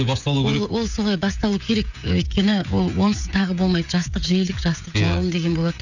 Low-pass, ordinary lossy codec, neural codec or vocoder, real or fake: 7.2 kHz; none; codec, 16 kHz, 16 kbps, FreqCodec, smaller model; fake